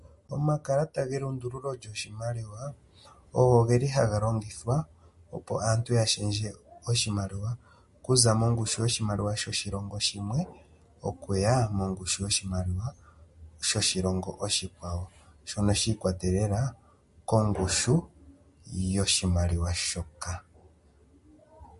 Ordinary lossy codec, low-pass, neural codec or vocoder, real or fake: MP3, 48 kbps; 14.4 kHz; none; real